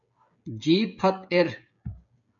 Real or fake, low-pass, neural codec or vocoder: fake; 7.2 kHz; codec, 16 kHz, 16 kbps, FreqCodec, smaller model